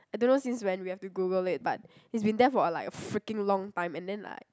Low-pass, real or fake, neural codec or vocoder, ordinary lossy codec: none; real; none; none